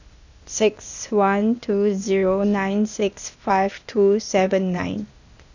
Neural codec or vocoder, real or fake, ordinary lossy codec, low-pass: codec, 16 kHz, 0.8 kbps, ZipCodec; fake; none; 7.2 kHz